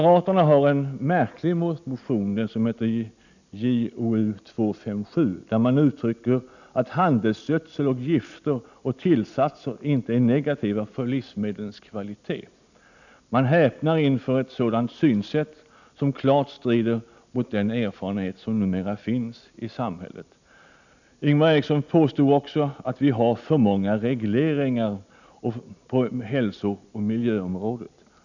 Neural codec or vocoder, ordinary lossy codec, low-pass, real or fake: none; none; 7.2 kHz; real